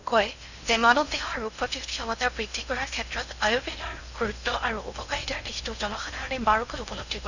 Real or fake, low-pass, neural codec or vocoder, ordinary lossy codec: fake; 7.2 kHz; codec, 16 kHz in and 24 kHz out, 0.6 kbps, FocalCodec, streaming, 4096 codes; none